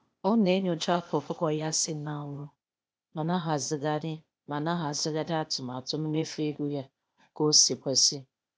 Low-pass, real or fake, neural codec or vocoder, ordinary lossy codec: none; fake; codec, 16 kHz, 0.8 kbps, ZipCodec; none